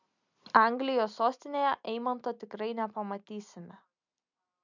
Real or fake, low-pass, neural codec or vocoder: real; 7.2 kHz; none